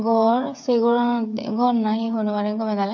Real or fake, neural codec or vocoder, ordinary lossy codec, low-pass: fake; codec, 16 kHz, 8 kbps, FreqCodec, smaller model; none; 7.2 kHz